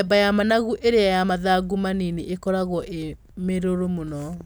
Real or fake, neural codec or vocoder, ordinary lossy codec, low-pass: real; none; none; none